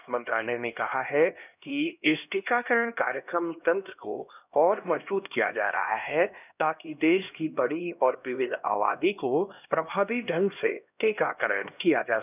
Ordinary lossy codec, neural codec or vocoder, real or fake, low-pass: none; codec, 16 kHz, 1 kbps, X-Codec, HuBERT features, trained on LibriSpeech; fake; 3.6 kHz